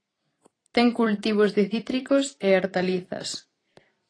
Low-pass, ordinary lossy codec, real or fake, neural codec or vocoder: 9.9 kHz; AAC, 32 kbps; fake; vocoder, 44.1 kHz, 128 mel bands every 256 samples, BigVGAN v2